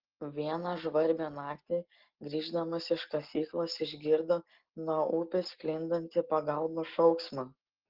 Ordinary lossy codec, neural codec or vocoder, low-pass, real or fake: Opus, 16 kbps; none; 5.4 kHz; real